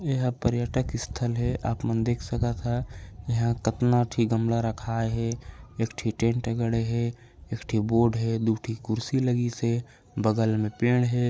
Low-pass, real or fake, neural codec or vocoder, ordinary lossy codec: none; real; none; none